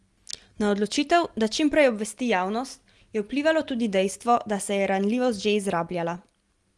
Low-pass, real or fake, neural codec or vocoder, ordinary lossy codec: 10.8 kHz; real; none; Opus, 24 kbps